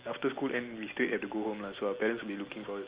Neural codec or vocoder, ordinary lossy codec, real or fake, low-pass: none; Opus, 32 kbps; real; 3.6 kHz